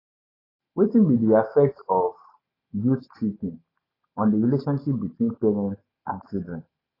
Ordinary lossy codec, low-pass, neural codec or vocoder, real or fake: AAC, 24 kbps; 5.4 kHz; none; real